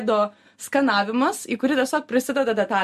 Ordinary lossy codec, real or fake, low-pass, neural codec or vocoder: MP3, 64 kbps; real; 14.4 kHz; none